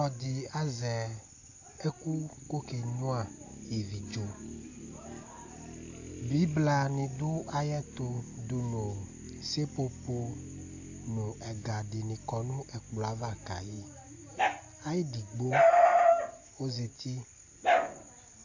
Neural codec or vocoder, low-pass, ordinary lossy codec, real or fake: none; 7.2 kHz; AAC, 48 kbps; real